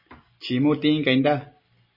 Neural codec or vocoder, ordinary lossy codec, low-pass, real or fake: none; MP3, 24 kbps; 5.4 kHz; real